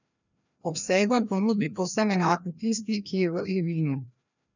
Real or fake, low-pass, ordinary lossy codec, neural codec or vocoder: fake; 7.2 kHz; none; codec, 16 kHz, 1 kbps, FreqCodec, larger model